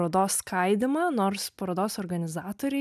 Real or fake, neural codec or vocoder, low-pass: real; none; 14.4 kHz